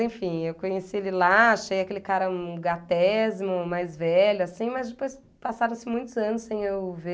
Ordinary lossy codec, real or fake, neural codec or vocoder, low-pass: none; real; none; none